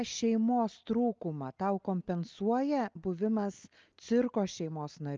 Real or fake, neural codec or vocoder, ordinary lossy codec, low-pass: real; none; Opus, 24 kbps; 7.2 kHz